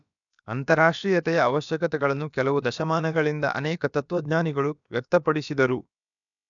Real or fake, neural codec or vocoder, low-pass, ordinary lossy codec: fake; codec, 16 kHz, about 1 kbps, DyCAST, with the encoder's durations; 7.2 kHz; none